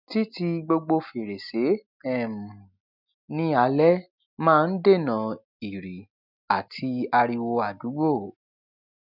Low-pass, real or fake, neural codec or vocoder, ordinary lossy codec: 5.4 kHz; real; none; none